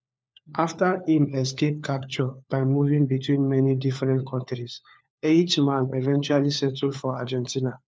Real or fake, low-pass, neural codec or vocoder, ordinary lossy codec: fake; none; codec, 16 kHz, 4 kbps, FunCodec, trained on LibriTTS, 50 frames a second; none